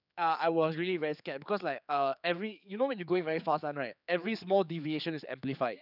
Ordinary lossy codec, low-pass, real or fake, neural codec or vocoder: none; 5.4 kHz; fake; codec, 16 kHz, 4 kbps, X-Codec, HuBERT features, trained on general audio